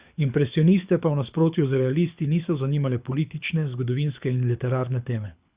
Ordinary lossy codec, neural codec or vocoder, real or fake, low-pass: Opus, 64 kbps; codec, 24 kHz, 6 kbps, HILCodec; fake; 3.6 kHz